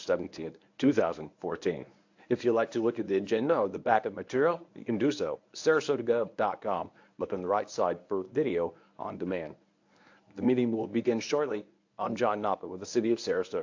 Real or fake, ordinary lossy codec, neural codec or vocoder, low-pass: fake; AAC, 48 kbps; codec, 24 kHz, 0.9 kbps, WavTokenizer, medium speech release version 1; 7.2 kHz